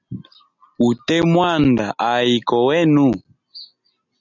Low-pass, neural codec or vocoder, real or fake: 7.2 kHz; none; real